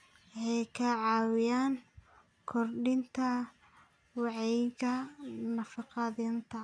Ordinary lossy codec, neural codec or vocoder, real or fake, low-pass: none; none; real; 9.9 kHz